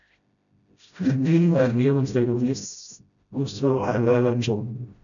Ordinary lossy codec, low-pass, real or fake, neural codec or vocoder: MP3, 96 kbps; 7.2 kHz; fake; codec, 16 kHz, 0.5 kbps, FreqCodec, smaller model